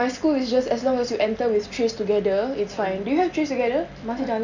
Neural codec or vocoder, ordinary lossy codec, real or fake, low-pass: none; none; real; 7.2 kHz